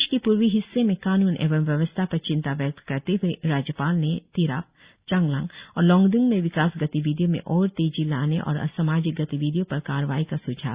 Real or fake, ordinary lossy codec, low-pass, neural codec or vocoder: real; Opus, 64 kbps; 3.6 kHz; none